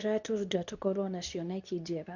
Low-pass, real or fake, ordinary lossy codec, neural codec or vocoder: 7.2 kHz; fake; none; codec, 24 kHz, 0.9 kbps, WavTokenizer, medium speech release version 2